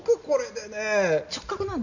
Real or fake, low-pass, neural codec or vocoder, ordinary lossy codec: real; 7.2 kHz; none; none